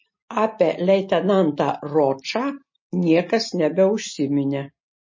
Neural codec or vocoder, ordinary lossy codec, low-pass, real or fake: none; MP3, 32 kbps; 7.2 kHz; real